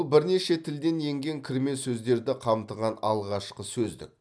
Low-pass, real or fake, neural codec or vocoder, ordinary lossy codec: none; real; none; none